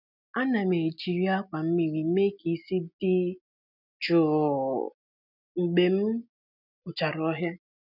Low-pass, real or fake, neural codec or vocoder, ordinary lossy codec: 5.4 kHz; real; none; none